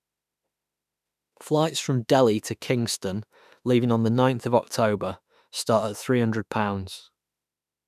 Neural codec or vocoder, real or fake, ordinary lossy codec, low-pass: autoencoder, 48 kHz, 32 numbers a frame, DAC-VAE, trained on Japanese speech; fake; none; 14.4 kHz